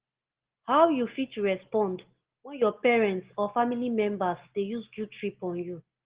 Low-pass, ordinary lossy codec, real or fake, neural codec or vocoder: 3.6 kHz; Opus, 16 kbps; real; none